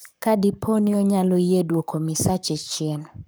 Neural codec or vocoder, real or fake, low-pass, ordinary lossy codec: codec, 44.1 kHz, 7.8 kbps, Pupu-Codec; fake; none; none